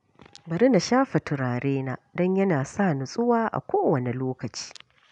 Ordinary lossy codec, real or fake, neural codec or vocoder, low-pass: none; real; none; 10.8 kHz